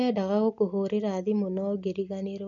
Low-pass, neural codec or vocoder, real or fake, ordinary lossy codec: 7.2 kHz; none; real; none